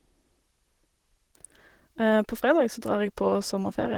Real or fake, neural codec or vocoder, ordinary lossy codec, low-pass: fake; vocoder, 44.1 kHz, 128 mel bands, Pupu-Vocoder; Opus, 16 kbps; 19.8 kHz